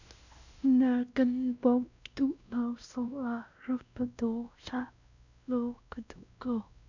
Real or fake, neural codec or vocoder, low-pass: fake; codec, 16 kHz, 1 kbps, X-Codec, WavLM features, trained on Multilingual LibriSpeech; 7.2 kHz